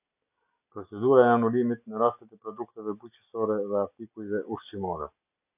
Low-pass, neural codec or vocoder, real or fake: 3.6 kHz; none; real